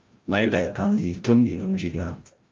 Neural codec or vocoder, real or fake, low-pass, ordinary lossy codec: codec, 16 kHz, 0.5 kbps, FreqCodec, larger model; fake; 7.2 kHz; Opus, 24 kbps